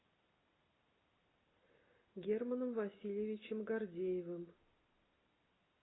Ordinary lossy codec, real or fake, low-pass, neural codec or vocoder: AAC, 16 kbps; real; 7.2 kHz; none